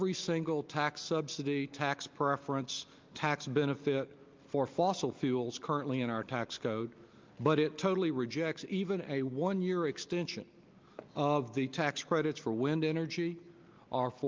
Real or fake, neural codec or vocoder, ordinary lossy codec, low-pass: real; none; Opus, 24 kbps; 7.2 kHz